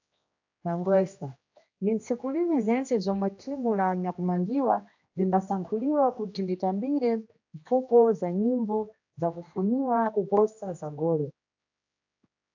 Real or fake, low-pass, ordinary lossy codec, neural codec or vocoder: fake; 7.2 kHz; AAC, 48 kbps; codec, 16 kHz, 1 kbps, X-Codec, HuBERT features, trained on general audio